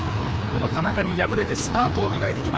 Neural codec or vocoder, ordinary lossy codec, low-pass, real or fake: codec, 16 kHz, 2 kbps, FreqCodec, larger model; none; none; fake